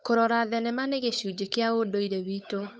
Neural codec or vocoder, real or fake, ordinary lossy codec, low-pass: codec, 16 kHz, 8 kbps, FunCodec, trained on Chinese and English, 25 frames a second; fake; none; none